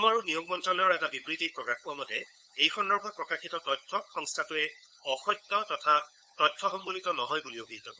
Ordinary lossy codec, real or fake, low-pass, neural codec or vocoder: none; fake; none; codec, 16 kHz, 8 kbps, FunCodec, trained on LibriTTS, 25 frames a second